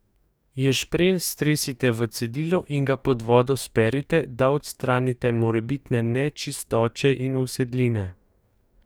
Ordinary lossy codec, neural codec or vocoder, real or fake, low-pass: none; codec, 44.1 kHz, 2.6 kbps, DAC; fake; none